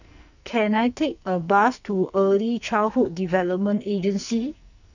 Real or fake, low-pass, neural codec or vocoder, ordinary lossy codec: fake; 7.2 kHz; codec, 44.1 kHz, 2.6 kbps, SNAC; none